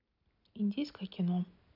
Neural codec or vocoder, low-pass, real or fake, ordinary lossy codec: none; 5.4 kHz; real; none